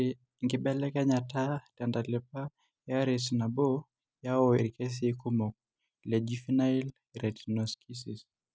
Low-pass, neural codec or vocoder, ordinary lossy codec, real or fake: none; none; none; real